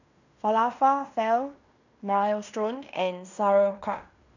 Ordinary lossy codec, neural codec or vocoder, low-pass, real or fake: none; codec, 16 kHz in and 24 kHz out, 0.9 kbps, LongCat-Audio-Codec, fine tuned four codebook decoder; 7.2 kHz; fake